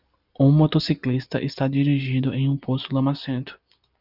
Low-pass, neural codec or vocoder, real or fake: 5.4 kHz; none; real